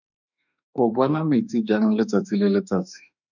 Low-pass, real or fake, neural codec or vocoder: 7.2 kHz; fake; autoencoder, 48 kHz, 32 numbers a frame, DAC-VAE, trained on Japanese speech